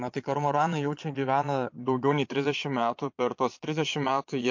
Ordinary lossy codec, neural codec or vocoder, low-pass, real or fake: MP3, 48 kbps; vocoder, 22.05 kHz, 80 mel bands, Vocos; 7.2 kHz; fake